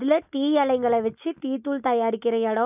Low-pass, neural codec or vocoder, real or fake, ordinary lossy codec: 3.6 kHz; none; real; none